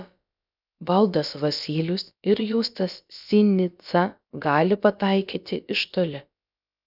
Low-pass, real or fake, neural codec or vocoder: 5.4 kHz; fake; codec, 16 kHz, about 1 kbps, DyCAST, with the encoder's durations